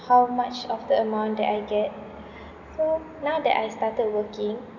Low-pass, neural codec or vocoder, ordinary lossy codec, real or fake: 7.2 kHz; none; none; real